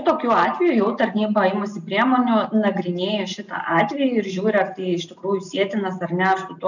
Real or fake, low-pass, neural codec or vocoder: real; 7.2 kHz; none